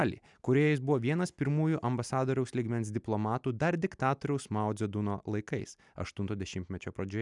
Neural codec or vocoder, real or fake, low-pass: none; real; 10.8 kHz